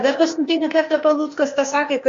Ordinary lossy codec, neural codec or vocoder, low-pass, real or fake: AAC, 48 kbps; codec, 16 kHz, 0.8 kbps, ZipCodec; 7.2 kHz; fake